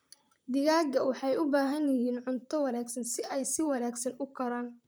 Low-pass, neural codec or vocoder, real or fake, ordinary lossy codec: none; vocoder, 44.1 kHz, 128 mel bands, Pupu-Vocoder; fake; none